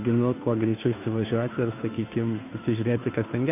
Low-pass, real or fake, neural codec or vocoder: 3.6 kHz; fake; codec, 16 kHz, 2 kbps, FunCodec, trained on Chinese and English, 25 frames a second